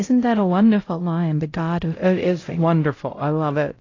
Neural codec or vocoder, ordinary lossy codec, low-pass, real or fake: codec, 16 kHz, 0.5 kbps, X-Codec, HuBERT features, trained on LibriSpeech; AAC, 32 kbps; 7.2 kHz; fake